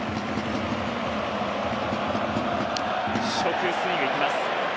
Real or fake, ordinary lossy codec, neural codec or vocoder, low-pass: real; none; none; none